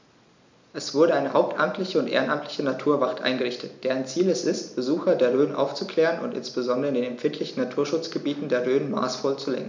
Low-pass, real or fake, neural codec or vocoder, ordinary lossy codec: 7.2 kHz; real; none; MP3, 64 kbps